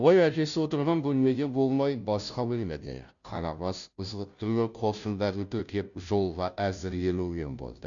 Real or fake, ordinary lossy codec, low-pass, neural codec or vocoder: fake; none; 7.2 kHz; codec, 16 kHz, 0.5 kbps, FunCodec, trained on Chinese and English, 25 frames a second